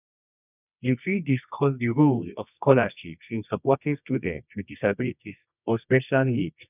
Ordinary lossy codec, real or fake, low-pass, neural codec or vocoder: none; fake; 3.6 kHz; codec, 24 kHz, 0.9 kbps, WavTokenizer, medium music audio release